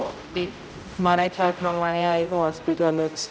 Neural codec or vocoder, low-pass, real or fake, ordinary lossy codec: codec, 16 kHz, 0.5 kbps, X-Codec, HuBERT features, trained on general audio; none; fake; none